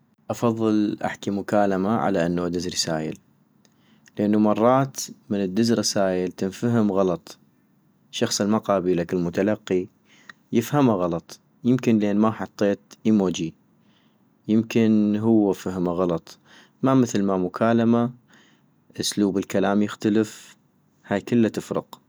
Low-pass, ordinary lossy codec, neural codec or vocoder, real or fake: none; none; none; real